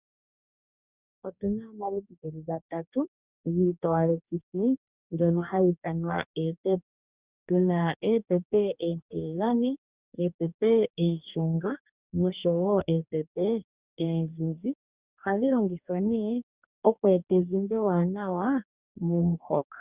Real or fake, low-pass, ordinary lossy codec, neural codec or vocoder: fake; 3.6 kHz; Opus, 64 kbps; codec, 44.1 kHz, 2.6 kbps, DAC